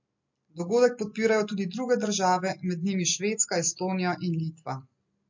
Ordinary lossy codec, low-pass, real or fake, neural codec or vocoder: MP3, 48 kbps; 7.2 kHz; real; none